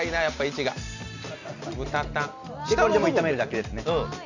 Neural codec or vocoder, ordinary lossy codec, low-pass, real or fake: none; none; 7.2 kHz; real